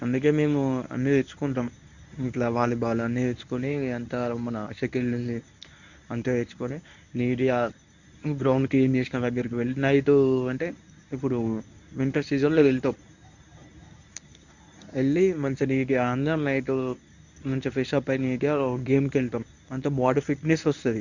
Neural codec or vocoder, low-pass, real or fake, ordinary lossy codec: codec, 24 kHz, 0.9 kbps, WavTokenizer, medium speech release version 1; 7.2 kHz; fake; none